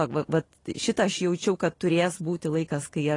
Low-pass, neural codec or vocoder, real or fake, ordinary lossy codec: 10.8 kHz; none; real; AAC, 32 kbps